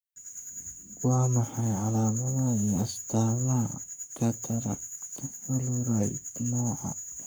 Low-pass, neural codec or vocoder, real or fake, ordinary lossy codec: none; codec, 44.1 kHz, 7.8 kbps, Pupu-Codec; fake; none